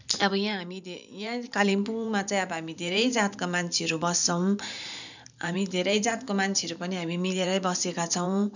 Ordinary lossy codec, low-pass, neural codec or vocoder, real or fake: none; 7.2 kHz; vocoder, 44.1 kHz, 128 mel bands every 512 samples, BigVGAN v2; fake